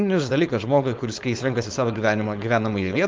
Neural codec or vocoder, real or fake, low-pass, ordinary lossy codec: codec, 16 kHz, 4.8 kbps, FACodec; fake; 7.2 kHz; Opus, 24 kbps